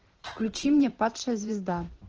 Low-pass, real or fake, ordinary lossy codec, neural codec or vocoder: 7.2 kHz; fake; Opus, 16 kbps; vocoder, 44.1 kHz, 128 mel bands every 512 samples, BigVGAN v2